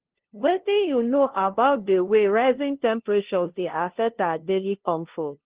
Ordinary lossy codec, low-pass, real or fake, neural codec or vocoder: Opus, 16 kbps; 3.6 kHz; fake; codec, 16 kHz, 0.5 kbps, FunCodec, trained on LibriTTS, 25 frames a second